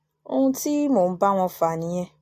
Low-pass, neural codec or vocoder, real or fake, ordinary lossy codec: 14.4 kHz; none; real; AAC, 64 kbps